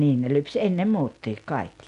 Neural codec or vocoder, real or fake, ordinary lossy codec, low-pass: none; real; none; 10.8 kHz